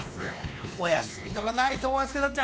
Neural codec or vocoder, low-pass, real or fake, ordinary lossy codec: codec, 16 kHz, 2 kbps, X-Codec, WavLM features, trained on Multilingual LibriSpeech; none; fake; none